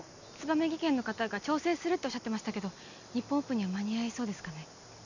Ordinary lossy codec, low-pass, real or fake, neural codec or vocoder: none; 7.2 kHz; real; none